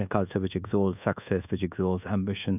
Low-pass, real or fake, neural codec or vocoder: 3.6 kHz; fake; codec, 24 kHz, 1.2 kbps, DualCodec